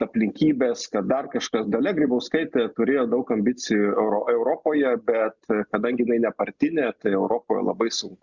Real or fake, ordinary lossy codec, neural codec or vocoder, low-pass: real; Opus, 64 kbps; none; 7.2 kHz